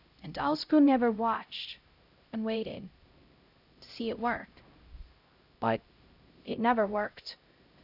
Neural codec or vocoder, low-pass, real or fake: codec, 16 kHz, 0.5 kbps, X-Codec, HuBERT features, trained on LibriSpeech; 5.4 kHz; fake